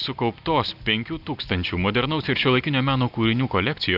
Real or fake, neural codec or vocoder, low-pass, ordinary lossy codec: real; none; 5.4 kHz; Opus, 24 kbps